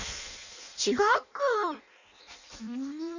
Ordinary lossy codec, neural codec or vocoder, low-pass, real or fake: none; codec, 16 kHz in and 24 kHz out, 0.6 kbps, FireRedTTS-2 codec; 7.2 kHz; fake